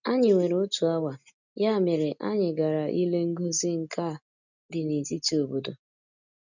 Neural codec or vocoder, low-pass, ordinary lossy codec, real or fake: none; 7.2 kHz; none; real